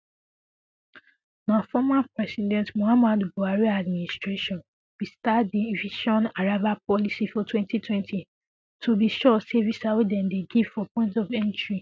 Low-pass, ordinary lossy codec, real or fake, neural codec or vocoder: none; none; real; none